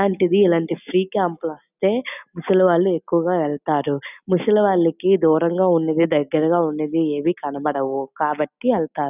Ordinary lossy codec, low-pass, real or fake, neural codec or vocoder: none; 3.6 kHz; real; none